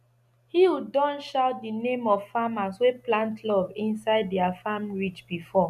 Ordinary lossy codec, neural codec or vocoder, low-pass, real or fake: none; none; 14.4 kHz; real